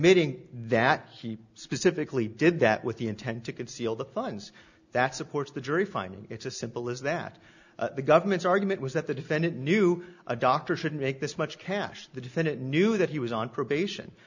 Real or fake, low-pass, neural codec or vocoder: real; 7.2 kHz; none